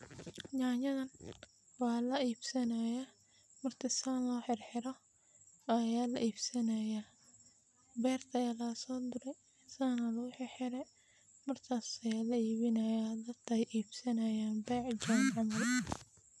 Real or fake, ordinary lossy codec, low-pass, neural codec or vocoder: real; none; none; none